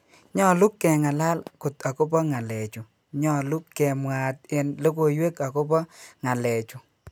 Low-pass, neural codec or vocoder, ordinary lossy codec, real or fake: none; none; none; real